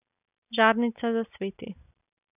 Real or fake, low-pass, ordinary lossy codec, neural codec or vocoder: real; 3.6 kHz; none; none